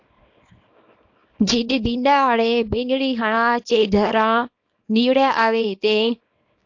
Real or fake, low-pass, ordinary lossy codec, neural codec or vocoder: fake; 7.2 kHz; AAC, 48 kbps; codec, 24 kHz, 0.9 kbps, WavTokenizer, small release